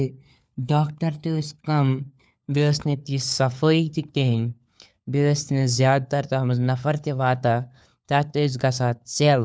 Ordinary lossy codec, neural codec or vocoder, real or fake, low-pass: none; codec, 16 kHz, 4 kbps, FunCodec, trained on LibriTTS, 50 frames a second; fake; none